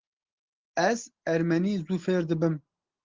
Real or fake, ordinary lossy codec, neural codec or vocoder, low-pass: real; Opus, 16 kbps; none; 7.2 kHz